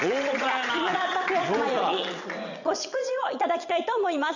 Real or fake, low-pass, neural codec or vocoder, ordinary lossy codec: fake; 7.2 kHz; vocoder, 22.05 kHz, 80 mel bands, WaveNeXt; none